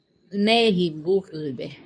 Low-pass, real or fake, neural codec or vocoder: 9.9 kHz; fake; codec, 24 kHz, 0.9 kbps, WavTokenizer, medium speech release version 2